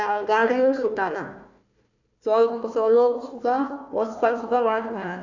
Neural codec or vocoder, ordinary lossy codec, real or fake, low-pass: codec, 16 kHz, 1 kbps, FunCodec, trained on Chinese and English, 50 frames a second; none; fake; 7.2 kHz